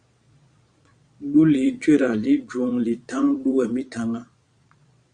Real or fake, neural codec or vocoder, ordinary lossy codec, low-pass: fake; vocoder, 22.05 kHz, 80 mel bands, Vocos; Opus, 64 kbps; 9.9 kHz